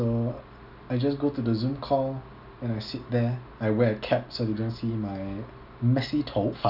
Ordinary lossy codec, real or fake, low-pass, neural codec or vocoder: none; real; 5.4 kHz; none